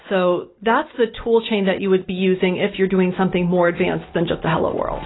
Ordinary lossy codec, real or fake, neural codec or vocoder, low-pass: AAC, 16 kbps; real; none; 7.2 kHz